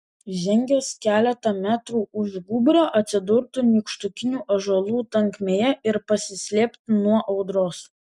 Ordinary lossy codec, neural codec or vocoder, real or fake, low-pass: MP3, 96 kbps; none; real; 10.8 kHz